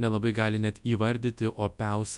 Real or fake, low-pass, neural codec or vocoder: fake; 10.8 kHz; codec, 24 kHz, 0.9 kbps, WavTokenizer, large speech release